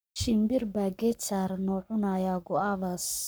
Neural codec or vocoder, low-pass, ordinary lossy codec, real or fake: codec, 44.1 kHz, 7.8 kbps, Pupu-Codec; none; none; fake